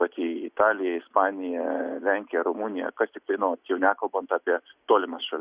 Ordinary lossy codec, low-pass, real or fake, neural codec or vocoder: Opus, 64 kbps; 3.6 kHz; real; none